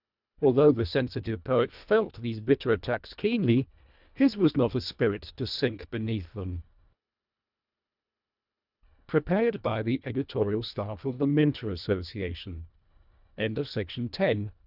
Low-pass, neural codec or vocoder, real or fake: 5.4 kHz; codec, 24 kHz, 1.5 kbps, HILCodec; fake